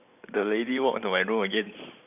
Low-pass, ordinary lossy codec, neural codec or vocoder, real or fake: 3.6 kHz; none; vocoder, 44.1 kHz, 128 mel bands every 512 samples, BigVGAN v2; fake